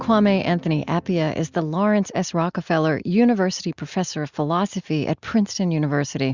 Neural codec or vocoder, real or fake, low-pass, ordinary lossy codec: none; real; 7.2 kHz; Opus, 64 kbps